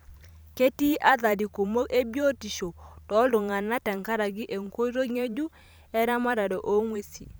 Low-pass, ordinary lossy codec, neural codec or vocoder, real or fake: none; none; vocoder, 44.1 kHz, 128 mel bands every 512 samples, BigVGAN v2; fake